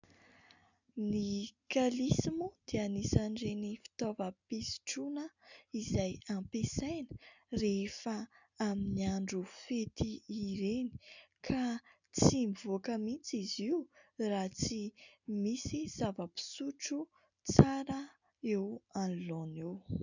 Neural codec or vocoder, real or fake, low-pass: none; real; 7.2 kHz